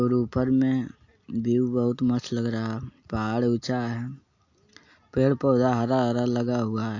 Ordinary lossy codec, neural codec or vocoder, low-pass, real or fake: AAC, 48 kbps; none; 7.2 kHz; real